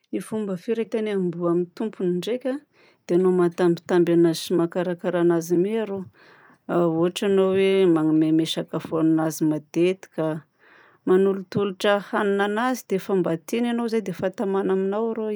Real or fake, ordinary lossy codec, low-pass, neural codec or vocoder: real; none; none; none